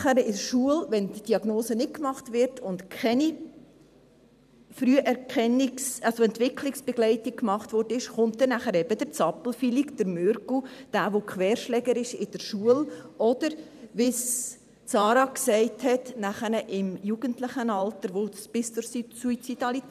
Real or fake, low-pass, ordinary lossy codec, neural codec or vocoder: fake; 14.4 kHz; none; vocoder, 44.1 kHz, 128 mel bands every 512 samples, BigVGAN v2